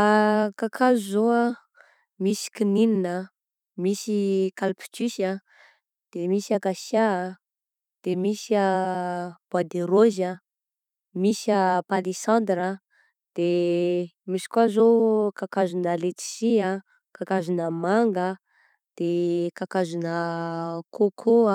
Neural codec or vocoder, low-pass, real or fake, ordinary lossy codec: vocoder, 44.1 kHz, 128 mel bands every 256 samples, BigVGAN v2; 19.8 kHz; fake; none